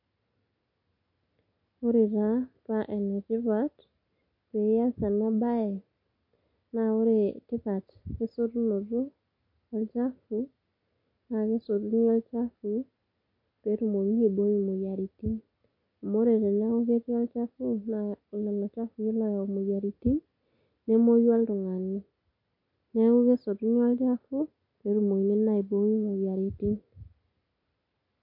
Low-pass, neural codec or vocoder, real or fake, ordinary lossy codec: 5.4 kHz; none; real; MP3, 32 kbps